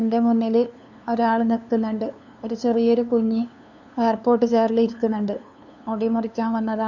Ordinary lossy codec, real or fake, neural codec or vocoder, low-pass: none; fake; codec, 16 kHz, 2 kbps, FunCodec, trained on LibriTTS, 25 frames a second; 7.2 kHz